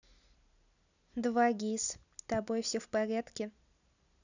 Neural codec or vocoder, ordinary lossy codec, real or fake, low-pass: none; none; real; 7.2 kHz